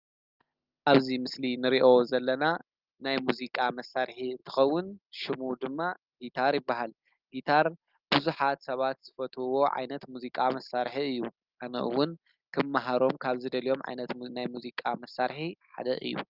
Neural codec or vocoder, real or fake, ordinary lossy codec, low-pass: none; real; Opus, 32 kbps; 5.4 kHz